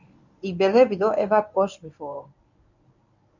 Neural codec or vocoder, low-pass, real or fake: codec, 24 kHz, 0.9 kbps, WavTokenizer, medium speech release version 1; 7.2 kHz; fake